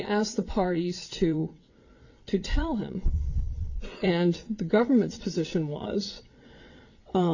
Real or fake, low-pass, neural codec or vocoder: fake; 7.2 kHz; vocoder, 22.05 kHz, 80 mel bands, WaveNeXt